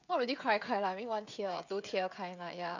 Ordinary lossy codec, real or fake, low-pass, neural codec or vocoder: none; fake; 7.2 kHz; codec, 16 kHz, 16 kbps, FreqCodec, smaller model